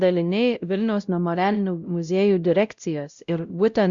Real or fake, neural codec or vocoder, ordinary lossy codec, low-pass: fake; codec, 16 kHz, 0.5 kbps, X-Codec, WavLM features, trained on Multilingual LibriSpeech; Opus, 64 kbps; 7.2 kHz